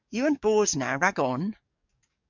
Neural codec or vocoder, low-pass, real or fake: codec, 44.1 kHz, 7.8 kbps, DAC; 7.2 kHz; fake